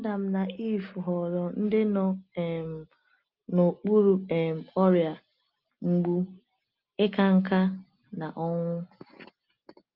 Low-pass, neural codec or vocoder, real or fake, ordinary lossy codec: 5.4 kHz; none; real; Opus, 24 kbps